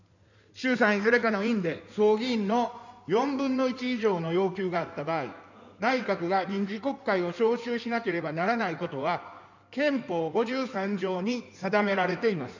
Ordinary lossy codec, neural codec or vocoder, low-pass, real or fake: none; codec, 16 kHz in and 24 kHz out, 2.2 kbps, FireRedTTS-2 codec; 7.2 kHz; fake